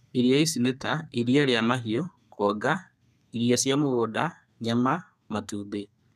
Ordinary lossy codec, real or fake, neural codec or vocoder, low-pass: none; fake; codec, 32 kHz, 1.9 kbps, SNAC; 14.4 kHz